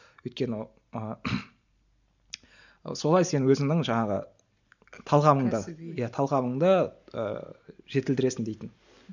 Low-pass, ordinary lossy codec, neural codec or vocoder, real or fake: 7.2 kHz; none; none; real